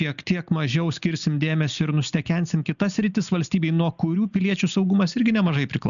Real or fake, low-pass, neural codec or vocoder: real; 7.2 kHz; none